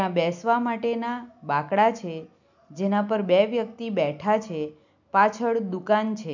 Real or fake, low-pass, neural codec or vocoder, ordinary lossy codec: real; 7.2 kHz; none; none